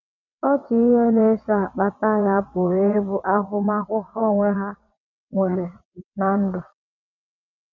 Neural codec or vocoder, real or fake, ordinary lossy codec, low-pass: vocoder, 22.05 kHz, 80 mel bands, WaveNeXt; fake; none; 7.2 kHz